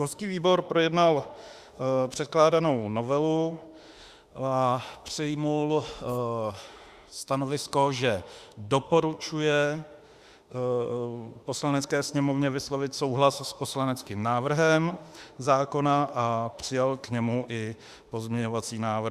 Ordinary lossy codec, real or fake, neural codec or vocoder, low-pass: Opus, 64 kbps; fake; autoencoder, 48 kHz, 32 numbers a frame, DAC-VAE, trained on Japanese speech; 14.4 kHz